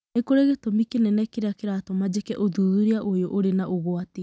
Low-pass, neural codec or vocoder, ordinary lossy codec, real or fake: none; none; none; real